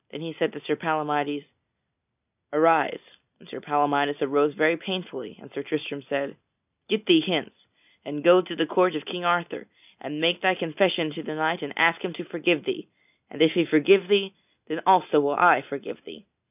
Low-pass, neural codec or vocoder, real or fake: 3.6 kHz; none; real